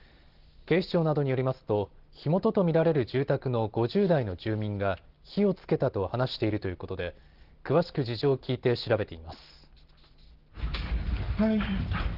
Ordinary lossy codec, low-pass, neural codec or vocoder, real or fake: Opus, 16 kbps; 5.4 kHz; none; real